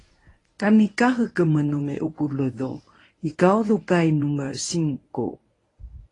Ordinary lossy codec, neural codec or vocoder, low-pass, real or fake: AAC, 32 kbps; codec, 24 kHz, 0.9 kbps, WavTokenizer, medium speech release version 1; 10.8 kHz; fake